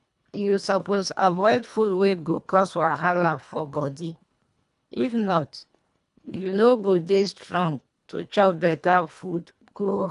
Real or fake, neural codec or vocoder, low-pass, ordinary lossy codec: fake; codec, 24 kHz, 1.5 kbps, HILCodec; 10.8 kHz; none